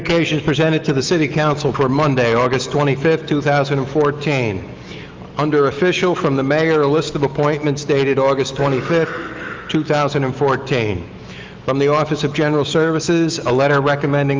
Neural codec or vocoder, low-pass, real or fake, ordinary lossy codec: autoencoder, 48 kHz, 128 numbers a frame, DAC-VAE, trained on Japanese speech; 7.2 kHz; fake; Opus, 24 kbps